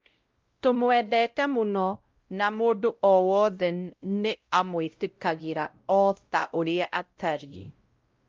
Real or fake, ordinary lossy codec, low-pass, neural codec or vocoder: fake; Opus, 32 kbps; 7.2 kHz; codec, 16 kHz, 0.5 kbps, X-Codec, WavLM features, trained on Multilingual LibriSpeech